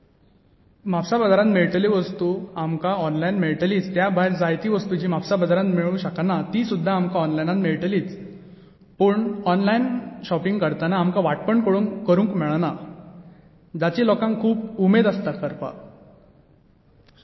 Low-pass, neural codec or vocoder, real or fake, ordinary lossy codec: 7.2 kHz; none; real; MP3, 24 kbps